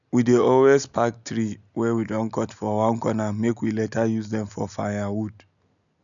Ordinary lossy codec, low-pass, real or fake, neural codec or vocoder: none; 7.2 kHz; real; none